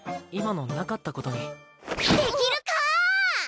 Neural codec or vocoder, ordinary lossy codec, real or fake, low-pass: none; none; real; none